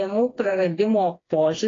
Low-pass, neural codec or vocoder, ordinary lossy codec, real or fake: 7.2 kHz; codec, 16 kHz, 2 kbps, FreqCodec, smaller model; AAC, 32 kbps; fake